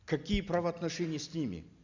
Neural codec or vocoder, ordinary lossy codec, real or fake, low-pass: none; Opus, 64 kbps; real; 7.2 kHz